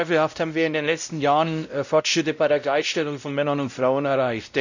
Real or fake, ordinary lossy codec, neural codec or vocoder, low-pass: fake; none; codec, 16 kHz, 0.5 kbps, X-Codec, WavLM features, trained on Multilingual LibriSpeech; 7.2 kHz